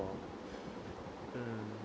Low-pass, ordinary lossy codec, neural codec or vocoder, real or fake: none; none; none; real